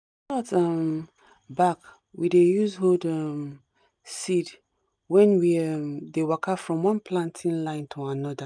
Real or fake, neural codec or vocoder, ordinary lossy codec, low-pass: real; none; none; 9.9 kHz